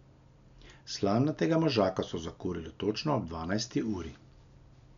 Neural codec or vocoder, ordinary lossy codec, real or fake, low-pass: none; none; real; 7.2 kHz